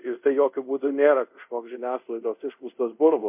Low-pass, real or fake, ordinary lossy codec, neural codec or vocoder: 3.6 kHz; fake; MP3, 32 kbps; codec, 24 kHz, 0.5 kbps, DualCodec